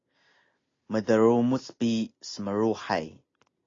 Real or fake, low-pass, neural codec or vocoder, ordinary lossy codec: real; 7.2 kHz; none; AAC, 32 kbps